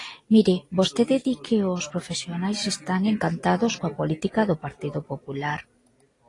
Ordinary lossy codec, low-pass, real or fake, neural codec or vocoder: AAC, 32 kbps; 10.8 kHz; real; none